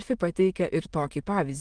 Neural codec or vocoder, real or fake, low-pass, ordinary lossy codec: autoencoder, 22.05 kHz, a latent of 192 numbers a frame, VITS, trained on many speakers; fake; 9.9 kHz; Opus, 24 kbps